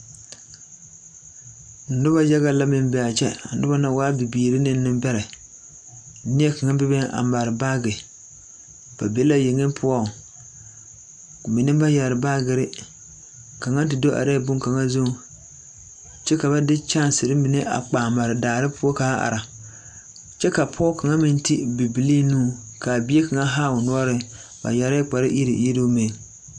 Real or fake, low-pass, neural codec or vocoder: real; 9.9 kHz; none